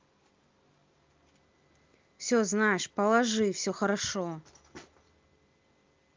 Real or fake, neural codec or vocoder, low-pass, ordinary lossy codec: real; none; 7.2 kHz; Opus, 24 kbps